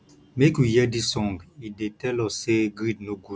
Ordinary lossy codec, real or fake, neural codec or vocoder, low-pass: none; real; none; none